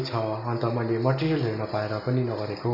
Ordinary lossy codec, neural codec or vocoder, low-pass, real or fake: none; none; 5.4 kHz; real